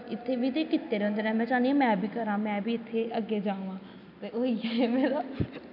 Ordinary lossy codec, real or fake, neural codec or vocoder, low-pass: none; real; none; 5.4 kHz